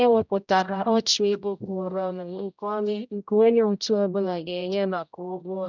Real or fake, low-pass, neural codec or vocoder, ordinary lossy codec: fake; 7.2 kHz; codec, 16 kHz, 0.5 kbps, X-Codec, HuBERT features, trained on general audio; none